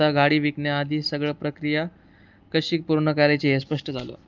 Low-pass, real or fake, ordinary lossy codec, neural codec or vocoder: 7.2 kHz; real; Opus, 32 kbps; none